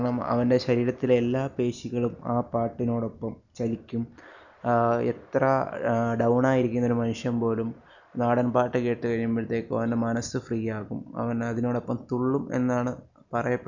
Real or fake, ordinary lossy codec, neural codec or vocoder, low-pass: real; none; none; 7.2 kHz